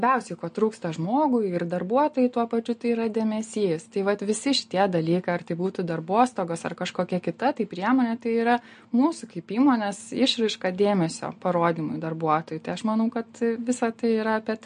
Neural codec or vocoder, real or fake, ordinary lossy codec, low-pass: none; real; MP3, 48 kbps; 9.9 kHz